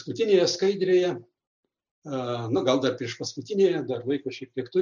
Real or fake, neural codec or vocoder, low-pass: real; none; 7.2 kHz